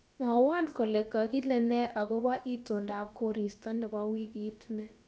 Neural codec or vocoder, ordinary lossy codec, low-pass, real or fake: codec, 16 kHz, about 1 kbps, DyCAST, with the encoder's durations; none; none; fake